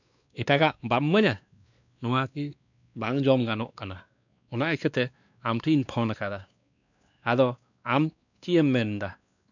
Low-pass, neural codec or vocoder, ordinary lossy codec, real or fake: 7.2 kHz; codec, 16 kHz, 2 kbps, X-Codec, WavLM features, trained on Multilingual LibriSpeech; none; fake